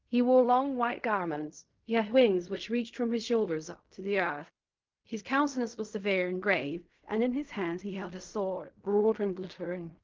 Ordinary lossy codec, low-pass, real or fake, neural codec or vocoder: Opus, 24 kbps; 7.2 kHz; fake; codec, 16 kHz in and 24 kHz out, 0.4 kbps, LongCat-Audio-Codec, fine tuned four codebook decoder